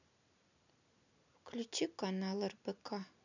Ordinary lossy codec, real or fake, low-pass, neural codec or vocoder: none; real; 7.2 kHz; none